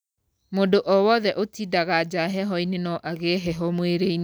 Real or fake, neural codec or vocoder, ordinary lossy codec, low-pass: real; none; none; none